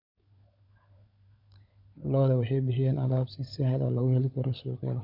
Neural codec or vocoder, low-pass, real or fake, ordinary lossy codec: codec, 16 kHz, 16 kbps, FunCodec, trained on LibriTTS, 50 frames a second; 5.4 kHz; fake; none